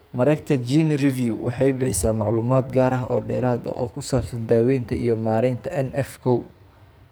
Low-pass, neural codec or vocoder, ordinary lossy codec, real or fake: none; codec, 44.1 kHz, 2.6 kbps, SNAC; none; fake